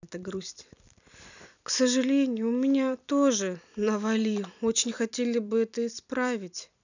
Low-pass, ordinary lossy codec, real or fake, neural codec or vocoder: 7.2 kHz; none; fake; vocoder, 44.1 kHz, 128 mel bands every 256 samples, BigVGAN v2